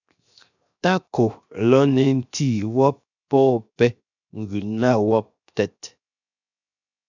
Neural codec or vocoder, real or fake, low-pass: codec, 16 kHz, 0.7 kbps, FocalCodec; fake; 7.2 kHz